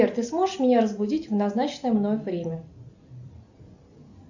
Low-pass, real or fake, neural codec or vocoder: 7.2 kHz; real; none